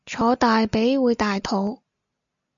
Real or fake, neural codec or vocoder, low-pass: real; none; 7.2 kHz